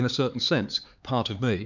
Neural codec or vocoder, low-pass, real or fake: codec, 16 kHz, 4 kbps, X-Codec, HuBERT features, trained on LibriSpeech; 7.2 kHz; fake